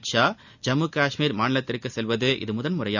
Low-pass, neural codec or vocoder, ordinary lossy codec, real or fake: 7.2 kHz; none; none; real